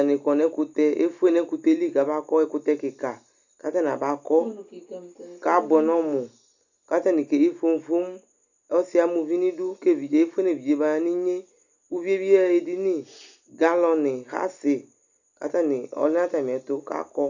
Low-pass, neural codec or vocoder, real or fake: 7.2 kHz; none; real